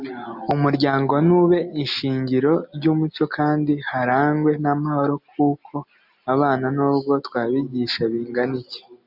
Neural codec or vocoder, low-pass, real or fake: none; 5.4 kHz; real